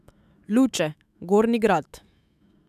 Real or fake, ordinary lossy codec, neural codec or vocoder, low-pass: fake; none; autoencoder, 48 kHz, 128 numbers a frame, DAC-VAE, trained on Japanese speech; 14.4 kHz